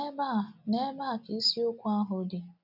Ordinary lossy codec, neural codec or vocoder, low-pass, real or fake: none; none; 5.4 kHz; real